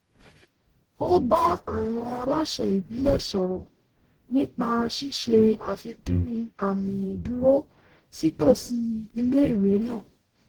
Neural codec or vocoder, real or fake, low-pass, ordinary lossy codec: codec, 44.1 kHz, 0.9 kbps, DAC; fake; 19.8 kHz; Opus, 16 kbps